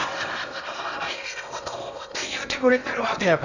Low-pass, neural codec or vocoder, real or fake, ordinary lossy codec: 7.2 kHz; codec, 16 kHz in and 24 kHz out, 0.8 kbps, FocalCodec, streaming, 65536 codes; fake; none